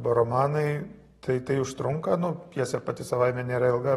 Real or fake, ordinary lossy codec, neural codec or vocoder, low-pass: real; AAC, 32 kbps; none; 19.8 kHz